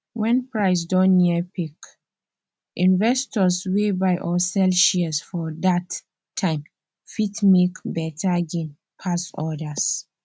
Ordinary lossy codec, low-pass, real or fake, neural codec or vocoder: none; none; real; none